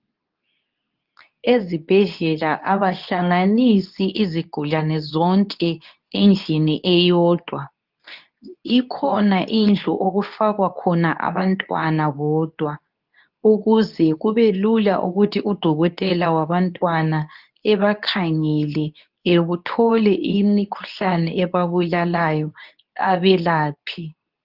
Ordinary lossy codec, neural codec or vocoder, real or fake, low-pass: Opus, 24 kbps; codec, 24 kHz, 0.9 kbps, WavTokenizer, medium speech release version 2; fake; 5.4 kHz